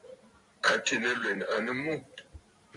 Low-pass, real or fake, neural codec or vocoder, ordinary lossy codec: 10.8 kHz; fake; vocoder, 44.1 kHz, 128 mel bands every 512 samples, BigVGAN v2; MP3, 96 kbps